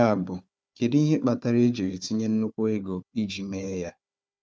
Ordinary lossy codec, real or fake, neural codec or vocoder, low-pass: none; fake; codec, 16 kHz, 4 kbps, FunCodec, trained on Chinese and English, 50 frames a second; none